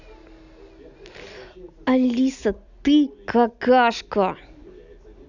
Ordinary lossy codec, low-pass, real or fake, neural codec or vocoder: none; 7.2 kHz; real; none